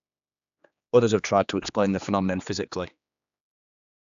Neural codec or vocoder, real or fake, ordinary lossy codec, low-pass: codec, 16 kHz, 2 kbps, X-Codec, HuBERT features, trained on general audio; fake; AAC, 96 kbps; 7.2 kHz